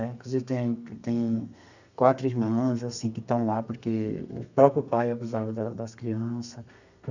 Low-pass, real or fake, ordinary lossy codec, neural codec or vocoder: 7.2 kHz; fake; none; codec, 32 kHz, 1.9 kbps, SNAC